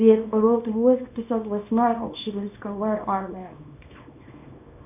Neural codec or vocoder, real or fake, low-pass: codec, 24 kHz, 0.9 kbps, WavTokenizer, small release; fake; 3.6 kHz